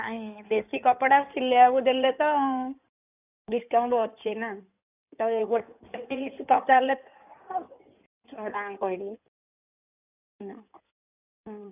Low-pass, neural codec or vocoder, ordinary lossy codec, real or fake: 3.6 kHz; codec, 16 kHz in and 24 kHz out, 2.2 kbps, FireRedTTS-2 codec; none; fake